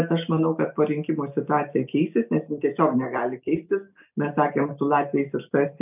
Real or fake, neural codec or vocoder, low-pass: real; none; 3.6 kHz